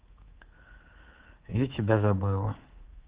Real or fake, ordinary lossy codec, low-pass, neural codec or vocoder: fake; Opus, 16 kbps; 3.6 kHz; codec, 24 kHz, 3.1 kbps, DualCodec